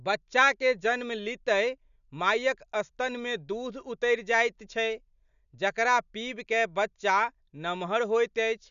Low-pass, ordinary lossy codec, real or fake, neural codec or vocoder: 7.2 kHz; none; real; none